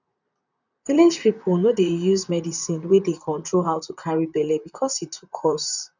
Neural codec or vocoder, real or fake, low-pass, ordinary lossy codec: vocoder, 44.1 kHz, 128 mel bands, Pupu-Vocoder; fake; 7.2 kHz; none